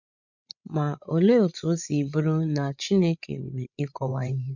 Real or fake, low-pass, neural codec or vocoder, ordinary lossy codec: fake; 7.2 kHz; codec, 16 kHz, 16 kbps, FreqCodec, larger model; none